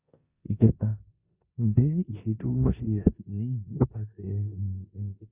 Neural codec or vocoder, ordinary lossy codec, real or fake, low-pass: codec, 16 kHz in and 24 kHz out, 0.9 kbps, LongCat-Audio-Codec, four codebook decoder; Opus, 64 kbps; fake; 3.6 kHz